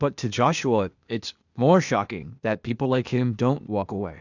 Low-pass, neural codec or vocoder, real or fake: 7.2 kHz; codec, 16 kHz, 0.8 kbps, ZipCodec; fake